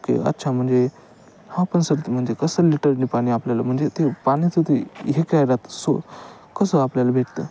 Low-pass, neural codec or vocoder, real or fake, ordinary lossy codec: none; none; real; none